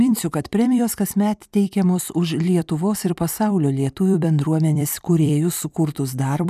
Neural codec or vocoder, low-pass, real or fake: vocoder, 44.1 kHz, 128 mel bands every 256 samples, BigVGAN v2; 14.4 kHz; fake